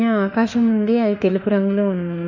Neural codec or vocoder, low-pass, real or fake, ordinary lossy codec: autoencoder, 48 kHz, 32 numbers a frame, DAC-VAE, trained on Japanese speech; 7.2 kHz; fake; none